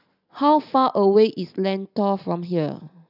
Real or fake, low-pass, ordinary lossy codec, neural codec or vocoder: real; 5.4 kHz; none; none